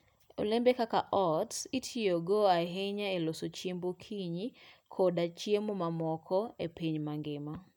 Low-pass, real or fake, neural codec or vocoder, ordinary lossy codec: 19.8 kHz; real; none; none